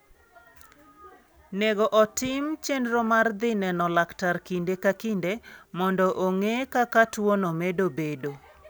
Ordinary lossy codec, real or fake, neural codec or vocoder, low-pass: none; real; none; none